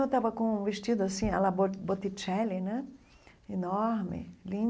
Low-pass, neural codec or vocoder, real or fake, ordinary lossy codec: none; none; real; none